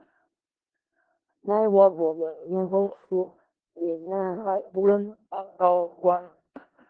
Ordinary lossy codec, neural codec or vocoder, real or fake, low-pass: Opus, 16 kbps; codec, 16 kHz in and 24 kHz out, 0.4 kbps, LongCat-Audio-Codec, four codebook decoder; fake; 9.9 kHz